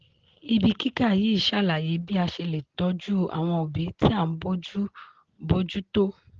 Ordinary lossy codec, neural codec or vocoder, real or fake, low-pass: Opus, 16 kbps; none; real; 7.2 kHz